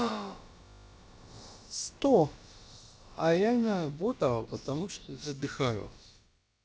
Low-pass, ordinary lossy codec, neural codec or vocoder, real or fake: none; none; codec, 16 kHz, about 1 kbps, DyCAST, with the encoder's durations; fake